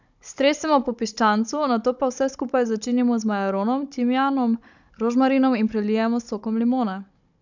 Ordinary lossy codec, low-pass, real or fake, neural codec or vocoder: none; 7.2 kHz; fake; codec, 16 kHz, 16 kbps, FunCodec, trained on Chinese and English, 50 frames a second